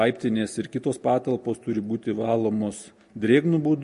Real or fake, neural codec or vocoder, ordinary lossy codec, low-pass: real; none; MP3, 48 kbps; 14.4 kHz